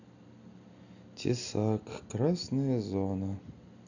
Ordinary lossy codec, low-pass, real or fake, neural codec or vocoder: none; 7.2 kHz; real; none